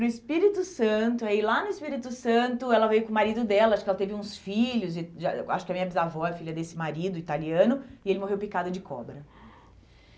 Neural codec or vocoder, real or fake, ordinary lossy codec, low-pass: none; real; none; none